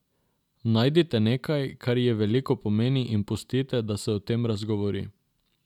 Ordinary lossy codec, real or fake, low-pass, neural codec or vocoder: none; real; 19.8 kHz; none